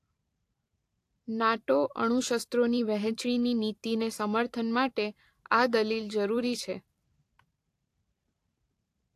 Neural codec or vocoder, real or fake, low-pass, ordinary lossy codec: vocoder, 44.1 kHz, 128 mel bands every 512 samples, BigVGAN v2; fake; 14.4 kHz; AAC, 64 kbps